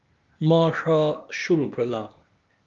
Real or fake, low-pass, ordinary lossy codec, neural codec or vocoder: fake; 7.2 kHz; Opus, 32 kbps; codec, 16 kHz, 0.8 kbps, ZipCodec